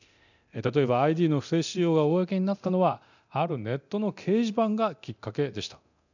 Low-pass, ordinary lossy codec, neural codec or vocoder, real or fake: 7.2 kHz; none; codec, 24 kHz, 0.9 kbps, DualCodec; fake